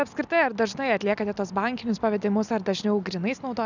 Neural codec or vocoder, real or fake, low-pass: none; real; 7.2 kHz